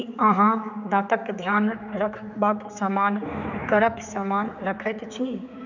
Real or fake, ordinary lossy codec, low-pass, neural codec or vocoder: fake; none; 7.2 kHz; codec, 16 kHz, 4 kbps, X-Codec, HuBERT features, trained on general audio